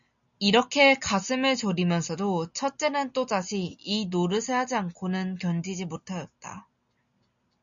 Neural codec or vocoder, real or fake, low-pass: none; real; 7.2 kHz